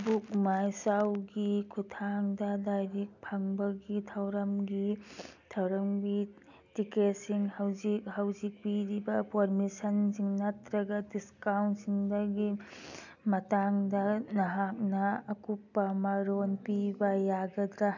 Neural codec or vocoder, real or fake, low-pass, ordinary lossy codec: none; real; 7.2 kHz; none